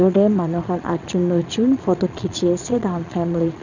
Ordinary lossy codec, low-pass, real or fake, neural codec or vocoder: none; 7.2 kHz; fake; vocoder, 22.05 kHz, 80 mel bands, Vocos